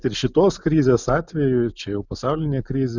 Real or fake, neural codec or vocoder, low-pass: real; none; 7.2 kHz